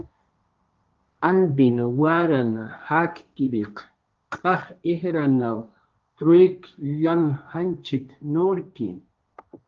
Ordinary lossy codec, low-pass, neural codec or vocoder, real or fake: Opus, 24 kbps; 7.2 kHz; codec, 16 kHz, 1.1 kbps, Voila-Tokenizer; fake